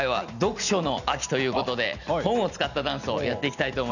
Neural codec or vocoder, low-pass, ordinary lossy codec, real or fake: none; 7.2 kHz; none; real